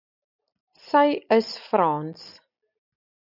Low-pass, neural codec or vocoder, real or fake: 5.4 kHz; none; real